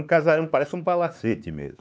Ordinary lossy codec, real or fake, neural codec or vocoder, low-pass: none; fake; codec, 16 kHz, 4 kbps, X-Codec, HuBERT features, trained on LibriSpeech; none